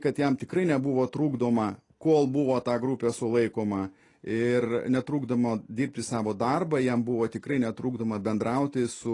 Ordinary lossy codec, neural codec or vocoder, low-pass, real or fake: AAC, 32 kbps; none; 10.8 kHz; real